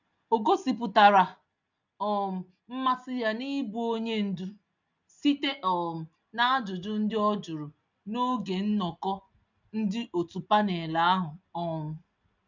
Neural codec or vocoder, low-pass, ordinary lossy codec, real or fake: none; 7.2 kHz; none; real